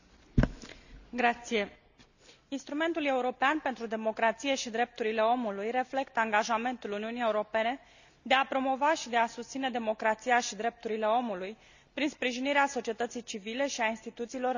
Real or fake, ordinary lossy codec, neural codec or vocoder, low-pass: real; none; none; 7.2 kHz